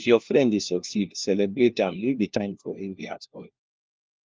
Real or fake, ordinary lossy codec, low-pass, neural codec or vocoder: fake; Opus, 24 kbps; 7.2 kHz; codec, 16 kHz, 1 kbps, FunCodec, trained on LibriTTS, 50 frames a second